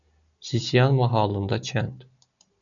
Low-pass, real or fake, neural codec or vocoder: 7.2 kHz; real; none